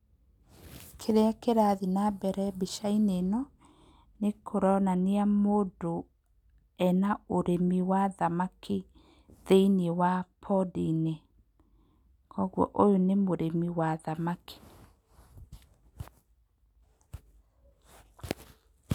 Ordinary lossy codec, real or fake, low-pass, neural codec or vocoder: none; real; 19.8 kHz; none